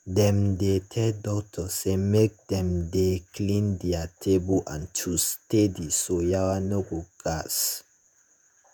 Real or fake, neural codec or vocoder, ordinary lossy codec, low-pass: fake; vocoder, 48 kHz, 128 mel bands, Vocos; none; none